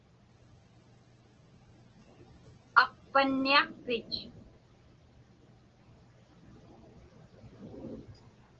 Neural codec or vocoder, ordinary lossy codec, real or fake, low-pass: none; Opus, 16 kbps; real; 7.2 kHz